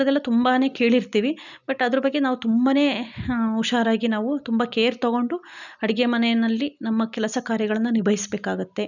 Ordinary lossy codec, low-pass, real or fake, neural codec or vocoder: none; 7.2 kHz; real; none